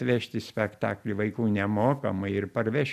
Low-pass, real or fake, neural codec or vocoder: 14.4 kHz; real; none